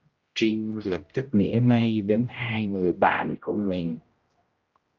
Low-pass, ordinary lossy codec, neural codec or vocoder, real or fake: 7.2 kHz; Opus, 32 kbps; codec, 16 kHz, 0.5 kbps, X-Codec, HuBERT features, trained on general audio; fake